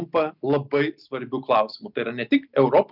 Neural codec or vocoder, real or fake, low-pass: none; real; 5.4 kHz